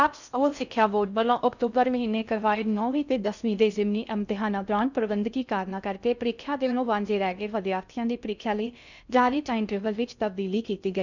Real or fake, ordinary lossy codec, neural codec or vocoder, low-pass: fake; none; codec, 16 kHz in and 24 kHz out, 0.6 kbps, FocalCodec, streaming, 4096 codes; 7.2 kHz